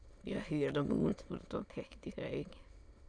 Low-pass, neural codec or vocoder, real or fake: 9.9 kHz; autoencoder, 22.05 kHz, a latent of 192 numbers a frame, VITS, trained on many speakers; fake